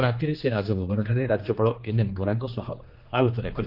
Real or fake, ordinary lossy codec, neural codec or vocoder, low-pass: fake; Opus, 24 kbps; codec, 16 kHz, 2 kbps, X-Codec, HuBERT features, trained on general audio; 5.4 kHz